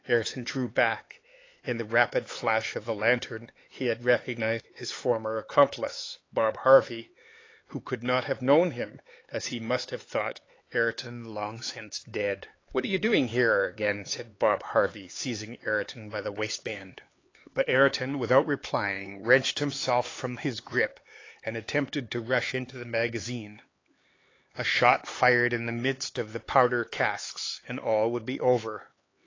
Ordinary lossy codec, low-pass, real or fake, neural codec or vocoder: AAC, 32 kbps; 7.2 kHz; fake; codec, 16 kHz, 4 kbps, X-Codec, HuBERT features, trained on LibriSpeech